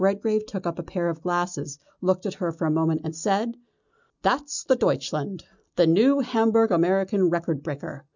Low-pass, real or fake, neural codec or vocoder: 7.2 kHz; real; none